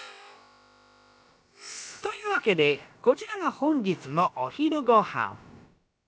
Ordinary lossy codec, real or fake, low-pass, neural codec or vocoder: none; fake; none; codec, 16 kHz, about 1 kbps, DyCAST, with the encoder's durations